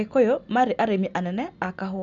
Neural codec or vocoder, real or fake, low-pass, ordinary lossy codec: none; real; 7.2 kHz; none